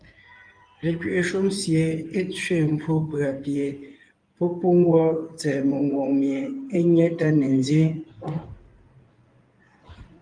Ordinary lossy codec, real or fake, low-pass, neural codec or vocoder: Opus, 32 kbps; fake; 9.9 kHz; codec, 16 kHz in and 24 kHz out, 2.2 kbps, FireRedTTS-2 codec